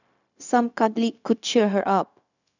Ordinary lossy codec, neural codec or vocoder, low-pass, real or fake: none; codec, 16 kHz, 0.4 kbps, LongCat-Audio-Codec; 7.2 kHz; fake